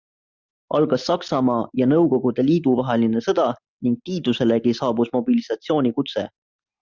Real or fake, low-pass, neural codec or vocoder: real; 7.2 kHz; none